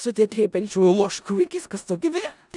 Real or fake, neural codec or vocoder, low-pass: fake; codec, 16 kHz in and 24 kHz out, 0.4 kbps, LongCat-Audio-Codec, four codebook decoder; 10.8 kHz